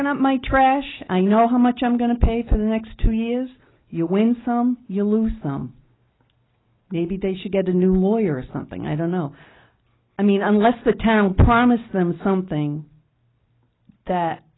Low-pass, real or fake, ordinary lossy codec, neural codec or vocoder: 7.2 kHz; real; AAC, 16 kbps; none